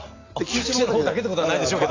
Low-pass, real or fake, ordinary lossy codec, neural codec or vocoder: 7.2 kHz; real; AAC, 32 kbps; none